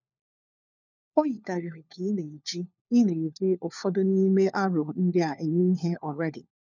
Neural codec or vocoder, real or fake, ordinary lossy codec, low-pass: codec, 16 kHz, 4 kbps, FunCodec, trained on LibriTTS, 50 frames a second; fake; none; 7.2 kHz